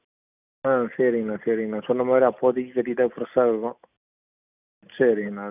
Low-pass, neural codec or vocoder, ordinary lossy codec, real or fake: 3.6 kHz; none; none; real